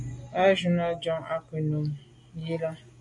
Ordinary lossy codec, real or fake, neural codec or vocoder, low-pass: MP3, 48 kbps; real; none; 10.8 kHz